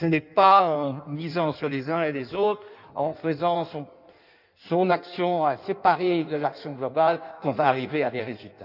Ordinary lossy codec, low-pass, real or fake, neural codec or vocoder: none; 5.4 kHz; fake; codec, 16 kHz in and 24 kHz out, 1.1 kbps, FireRedTTS-2 codec